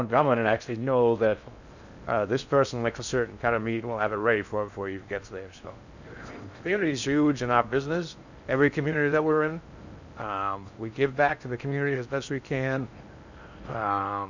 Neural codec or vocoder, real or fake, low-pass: codec, 16 kHz in and 24 kHz out, 0.6 kbps, FocalCodec, streaming, 4096 codes; fake; 7.2 kHz